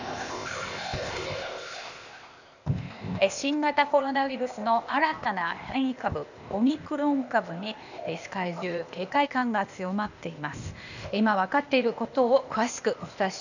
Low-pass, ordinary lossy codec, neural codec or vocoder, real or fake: 7.2 kHz; none; codec, 16 kHz, 0.8 kbps, ZipCodec; fake